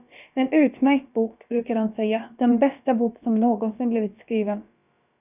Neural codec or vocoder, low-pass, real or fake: codec, 16 kHz, about 1 kbps, DyCAST, with the encoder's durations; 3.6 kHz; fake